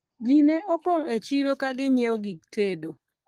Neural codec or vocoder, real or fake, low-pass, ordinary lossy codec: codec, 32 kHz, 1.9 kbps, SNAC; fake; 14.4 kHz; Opus, 24 kbps